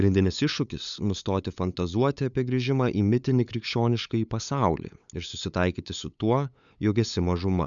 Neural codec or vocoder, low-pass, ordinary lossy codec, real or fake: codec, 16 kHz, 8 kbps, FunCodec, trained on LibriTTS, 25 frames a second; 7.2 kHz; MP3, 96 kbps; fake